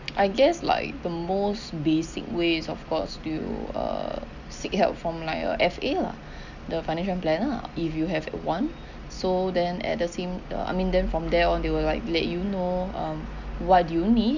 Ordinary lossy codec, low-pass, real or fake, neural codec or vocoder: none; 7.2 kHz; real; none